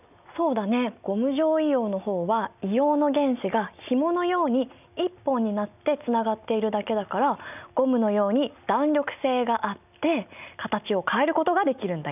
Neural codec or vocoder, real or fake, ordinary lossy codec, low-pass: none; real; none; 3.6 kHz